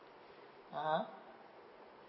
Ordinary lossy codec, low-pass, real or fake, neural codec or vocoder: MP3, 24 kbps; 7.2 kHz; real; none